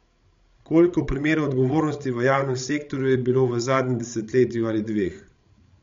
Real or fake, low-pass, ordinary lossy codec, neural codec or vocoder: fake; 7.2 kHz; MP3, 48 kbps; codec, 16 kHz, 16 kbps, FreqCodec, larger model